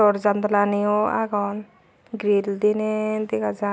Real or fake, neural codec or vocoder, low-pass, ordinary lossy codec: real; none; none; none